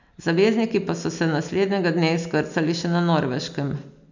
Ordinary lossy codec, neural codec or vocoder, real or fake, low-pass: none; none; real; 7.2 kHz